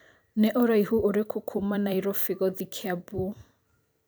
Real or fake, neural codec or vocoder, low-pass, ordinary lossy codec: fake; vocoder, 44.1 kHz, 128 mel bands every 256 samples, BigVGAN v2; none; none